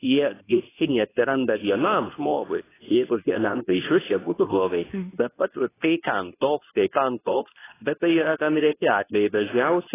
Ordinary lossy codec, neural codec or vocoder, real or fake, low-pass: AAC, 16 kbps; codec, 24 kHz, 0.9 kbps, WavTokenizer, medium speech release version 2; fake; 3.6 kHz